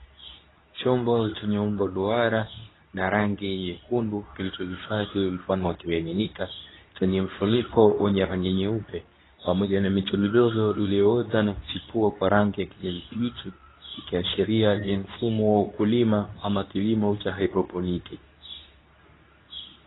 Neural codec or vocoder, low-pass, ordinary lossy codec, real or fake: codec, 24 kHz, 0.9 kbps, WavTokenizer, medium speech release version 2; 7.2 kHz; AAC, 16 kbps; fake